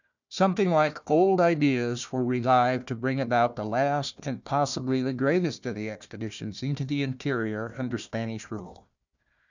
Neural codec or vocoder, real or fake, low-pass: codec, 16 kHz, 1 kbps, FunCodec, trained on Chinese and English, 50 frames a second; fake; 7.2 kHz